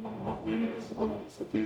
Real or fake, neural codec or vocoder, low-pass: fake; codec, 44.1 kHz, 0.9 kbps, DAC; 19.8 kHz